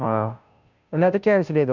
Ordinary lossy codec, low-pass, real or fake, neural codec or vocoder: none; 7.2 kHz; fake; codec, 16 kHz, 0.5 kbps, FunCodec, trained on Chinese and English, 25 frames a second